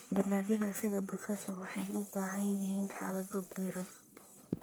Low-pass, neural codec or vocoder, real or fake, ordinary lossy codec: none; codec, 44.1 kHz, 1.7 kbps, Pupu-Codec; fake; none